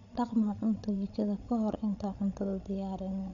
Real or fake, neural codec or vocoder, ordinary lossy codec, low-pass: fake; codec, 16 kHz, 16 kbps, FreqCodec, larger model; none; 7.2 kHz